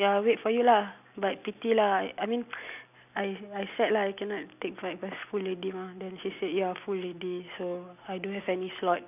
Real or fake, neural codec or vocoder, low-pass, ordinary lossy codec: real; none; 3.6 kHz; none